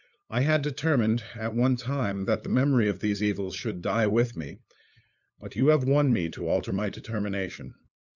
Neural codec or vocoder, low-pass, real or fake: codec, 16 kHz, 8 kbps, FunCodec, trained on LibriTTS, 25 frames a second; 7.2 kHz; fake